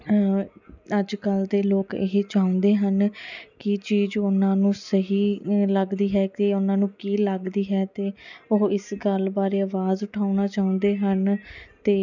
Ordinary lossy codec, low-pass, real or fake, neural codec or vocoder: none; 7.2 kHz; real; none